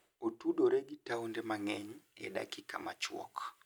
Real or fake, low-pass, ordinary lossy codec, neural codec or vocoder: real; none; none; none